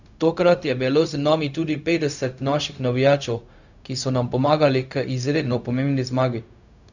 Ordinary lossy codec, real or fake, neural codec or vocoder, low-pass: none; fake; codec, 16 kHz, 0.4 kbps, LongCat-Audio-Codec; 7.2 kHz